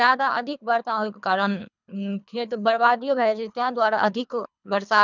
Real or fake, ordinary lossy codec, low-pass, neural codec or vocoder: fake; none; 7.2 kHz; codec, 24 kHz, 3 kbps, HILCodec